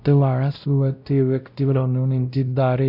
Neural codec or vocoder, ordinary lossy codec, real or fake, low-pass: codec, 16 kHz, 0.5 kbps, X-Codec, WavLM features, trained on Multilingual LibriSpeech; none; fake; 5.4 kHz